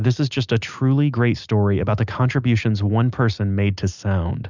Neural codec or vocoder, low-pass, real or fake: none; 7.2 kHz; real